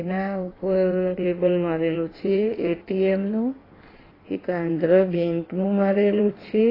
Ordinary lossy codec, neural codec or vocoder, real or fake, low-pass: AAC, 24 kbps; codec, 16 kHz in and 24 kHz out, 1.1 kbps, FireRedTTS-2 codec; fake; 5.4 kHz